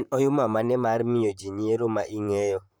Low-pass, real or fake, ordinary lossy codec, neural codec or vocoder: none; fake; none; vocoder, 44.1 kHz, 128 mel bands, Pupu-Vocoder